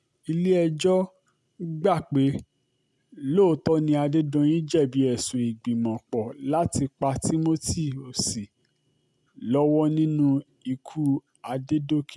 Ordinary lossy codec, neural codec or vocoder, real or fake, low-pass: none; none; real; none